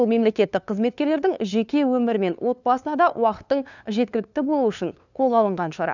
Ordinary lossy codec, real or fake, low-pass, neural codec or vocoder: none; fake; 7.2 kHz; codec, 16 kHz, 2 kbps, FunCodec, trained on LibriTTS, 25 frames a second